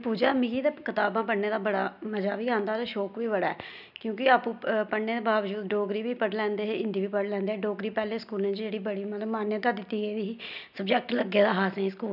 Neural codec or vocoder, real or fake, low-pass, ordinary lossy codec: none; real; 5.4 kHz; none